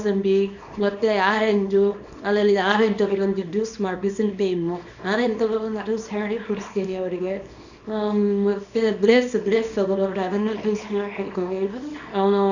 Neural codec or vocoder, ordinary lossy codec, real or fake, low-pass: codec, 24 kHz, 0.9 kbps, WavTokenizer, small release; none; fake; 7.2 kHz